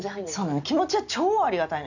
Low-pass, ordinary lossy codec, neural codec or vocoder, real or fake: 7.2 kHz; none; none; real